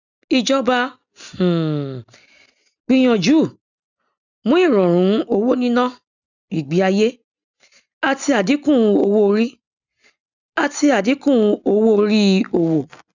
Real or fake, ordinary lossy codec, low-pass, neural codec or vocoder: real; none; 7.2 kHz; none